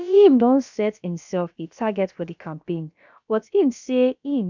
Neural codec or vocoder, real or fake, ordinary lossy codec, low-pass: codec, 16 kHz, about 1 kbps, DyCAST, with the encoder's durations; fake; none; 7.2 kHz